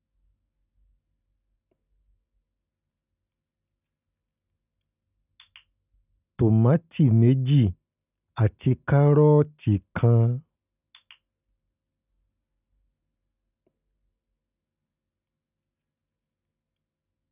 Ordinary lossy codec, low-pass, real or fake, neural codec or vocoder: none; 3.6 kHz; real; none